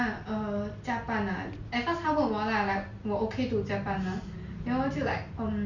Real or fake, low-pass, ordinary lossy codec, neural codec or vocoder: real; 7.2 kHz; Opus, 64 kbps; none